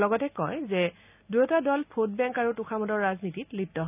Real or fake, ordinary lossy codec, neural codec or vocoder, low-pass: real; none; none; 3.6 kHz